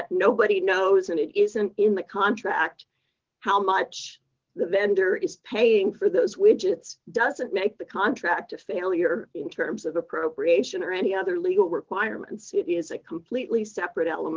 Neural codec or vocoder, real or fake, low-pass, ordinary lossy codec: none; real; 7.2 kHz; Opus, 16 kbps